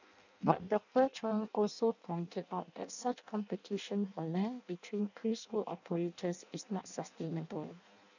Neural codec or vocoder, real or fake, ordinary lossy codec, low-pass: codec, 16 kHz in and 24 kHz out, 0.6 kbps, FireRedTTS-2 codec; fake; none; 7.2 kHz